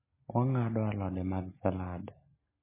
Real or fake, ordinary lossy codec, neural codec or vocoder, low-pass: real; MP3, 16 kbps; none; 3.6 kHz